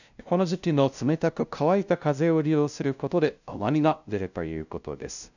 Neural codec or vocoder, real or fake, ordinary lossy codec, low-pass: codec, 16 kHz, 0.5 kbps, FunCodec, trained on LibriTTS, 25 frames a second; fake; none; 7.2 kHz